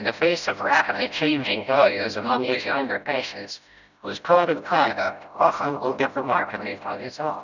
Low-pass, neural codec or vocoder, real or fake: 7.2 kHz; codec, 16 kHz, 0.5 kbps, FreqCodec, smaller model; fake